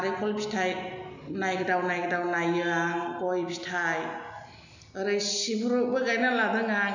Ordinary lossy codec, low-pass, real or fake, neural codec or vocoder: none; 7.2 kHz; real; none